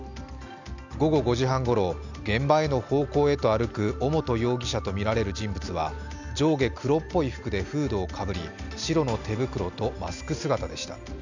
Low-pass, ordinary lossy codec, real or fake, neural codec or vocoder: 7.2 kHz; none; real; none